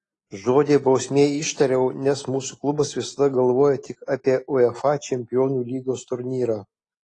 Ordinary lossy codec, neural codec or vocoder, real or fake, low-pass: AAC, 32 kbps; none; real; 10.8 kHz